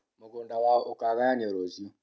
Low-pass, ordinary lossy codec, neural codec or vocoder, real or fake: none; none; none; real